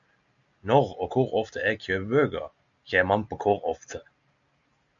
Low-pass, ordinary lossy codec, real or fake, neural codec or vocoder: 7.2 kHz; MP3, 64 kbps; real; none